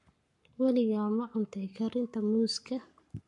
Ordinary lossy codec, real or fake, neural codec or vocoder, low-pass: MP3, 64 kbps; fake; codec, 44.1 kHz, 7.8 kbps, Pupu-Codec; 10.8 kHz